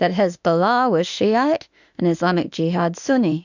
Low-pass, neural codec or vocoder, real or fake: 7.2 kHz; autoencoder, 48 kHz, 32 numbers a frame, DAC-VAE, trained on Japanese speech; fake